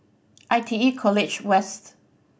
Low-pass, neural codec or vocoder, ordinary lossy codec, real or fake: none; none; none; real